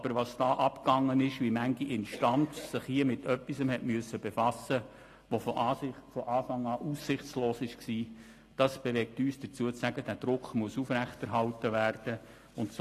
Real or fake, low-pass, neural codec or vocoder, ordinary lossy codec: real; 14.4 kHz; none; AAC, 48 kbps